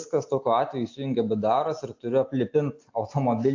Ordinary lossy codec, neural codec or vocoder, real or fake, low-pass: AAC, 48 kbps; none; real; 7.2 kHz